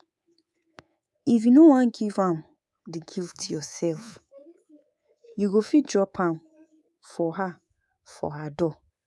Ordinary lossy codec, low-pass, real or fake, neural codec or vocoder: none; none; fake; codec, 24 kHz, 3.1 kbps, DualCodec